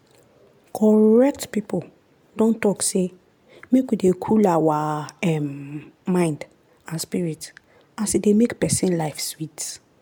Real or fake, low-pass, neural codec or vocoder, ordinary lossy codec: real; 19.8 kHz; none; MP3, 96 kbps